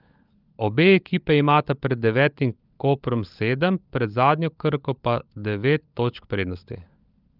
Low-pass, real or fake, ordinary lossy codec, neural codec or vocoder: 5.4 kHz; fake; Opus, 24 kbps; codec, 16 kHz, 16 kbps, FunCodec, trained on LibriTTS, 50 frames a second